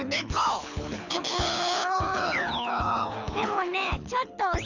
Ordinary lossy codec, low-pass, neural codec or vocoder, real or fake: none; 7.2 kHz; codec, 24 kHz, 6 kbps, HILCodec; fake